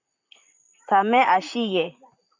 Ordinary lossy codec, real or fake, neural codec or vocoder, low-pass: MP3, 64 kbps; fake; vocoder, 44.1 kHz, 128 mel bands, Pupu-Vocoder; 7.2 kHz